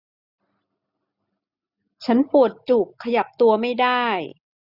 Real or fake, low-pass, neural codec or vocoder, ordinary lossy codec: real; 5.4 kHz; none; none